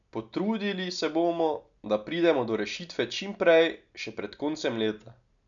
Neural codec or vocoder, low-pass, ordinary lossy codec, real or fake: none; 7.2 kHz; none; real